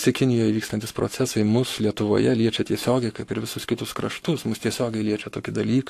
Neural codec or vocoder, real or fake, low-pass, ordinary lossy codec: codec, 44.1 kHz, 7.8 kbps, Pupu-Codec; fake; 14.4 kHz; AAC, 64 kbps